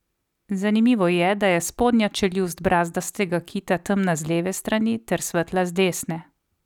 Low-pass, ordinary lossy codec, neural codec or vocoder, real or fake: 19.8 kHz; none; none; real